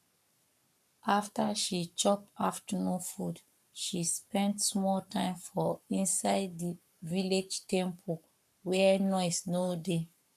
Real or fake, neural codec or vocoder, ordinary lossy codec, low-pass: fake; codec, 44.1 kHz, 7.8 kbps, Pupu-Codec; none; 14.4 kHz